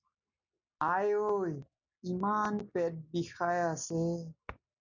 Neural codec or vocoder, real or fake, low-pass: none; real; 7.2 kHz